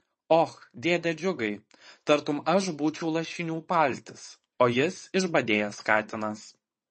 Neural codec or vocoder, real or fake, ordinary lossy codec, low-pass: vocoder, 22.05 kHz, 80 mel bands, WaveNeXt; fake; MP3, 32 kbps; 9.9 kHz